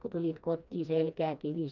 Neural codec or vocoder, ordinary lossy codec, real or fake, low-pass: codec, 16 kHz, 2 kbps, FreqCodec, smaller model; none; fake; 7.2 kHz